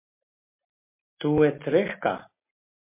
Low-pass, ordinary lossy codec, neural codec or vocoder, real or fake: 3.6 kHz; MP3, 24 kbps; none; real